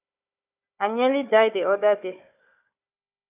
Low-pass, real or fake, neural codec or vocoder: 3.6 kHz; fake; codec, 16 kHz, 4 kbps, FunCodec, trained on Chinese and English, 50 frames a second